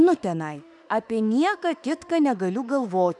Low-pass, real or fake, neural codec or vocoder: 10.8 kHz; fake; autoencoder, 48 kHz, 32 numbers a frame, DAC-VAE, trained on Japanese speech